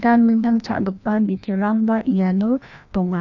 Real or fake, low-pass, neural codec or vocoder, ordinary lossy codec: fake; 7.2 kHz; codec, 16 kHz, 1 kbps, FreqCodec, larger model; none